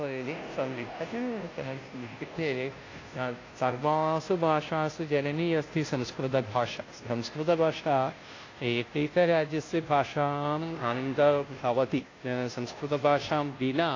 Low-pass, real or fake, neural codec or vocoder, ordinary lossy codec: 7.2 kHz; fake; codec, 16 kHz, 0.5 kbps, FunCodec, trained on Chinese and English, 25 frames a second; AAC, 32 kbps